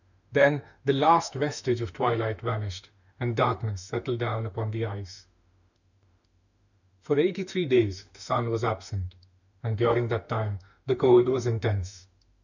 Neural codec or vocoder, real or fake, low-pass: autoencoder, 48 kHz, 32 numbers a frame, DAC-VAE, trained on Japanese speech; fake; 7.2 kHz